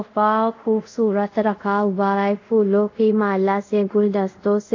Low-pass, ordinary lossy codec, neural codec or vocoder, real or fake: 7.2 kHz; AAC, 48 kbps; codec, 24 kHz, 0.5 kbps, DualCodec; fake